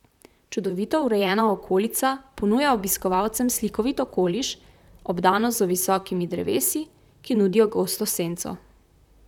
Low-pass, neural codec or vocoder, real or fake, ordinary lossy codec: 19.8 kHz; vocoder, 44.1 kHz, 128 mel bands, Pupu-Vocoder; fake; none